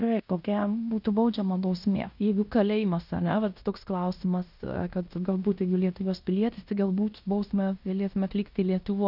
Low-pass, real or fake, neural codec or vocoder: 5.4 kHz; fake; codec, 16 kHz in and 24 kHz out, 0.9 kbps, LongCat-Audio-Codec, fine tuned four codebook decoder